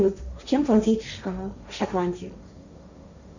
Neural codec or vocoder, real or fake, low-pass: codec, 16 kHz, 1.1 kbps, Voila-Tokenizer; fake; 7.2 kHz